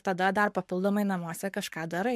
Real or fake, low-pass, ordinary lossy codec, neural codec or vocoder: fake; 14.4 kHz; MP3, 96 kbps; codec, 44.1 kHz, 7.8 kbps, Pupu-Codec